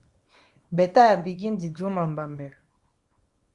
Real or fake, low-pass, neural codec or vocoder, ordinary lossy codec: fake; 10.8 kHz; codec, 24 kHz, 0.9 kbps, WavTokenizer, small release; Opus, 64 kbps